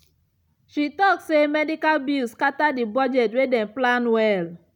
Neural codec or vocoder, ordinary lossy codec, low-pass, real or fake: none; none; 19.8 kHz; real